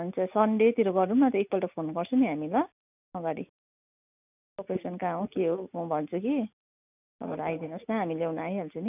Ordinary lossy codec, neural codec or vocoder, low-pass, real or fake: none; none; 3.6 kHz; real